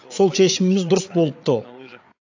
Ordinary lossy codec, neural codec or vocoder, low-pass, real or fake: AAC, 48 kbps; codec, 16 kHz, 8 kbps, FreqCodec, larger model; 7.2 kHz; fake